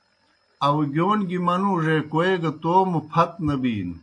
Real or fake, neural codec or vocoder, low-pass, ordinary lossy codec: real; none; 9.9 kHz; AAC, 48 kbps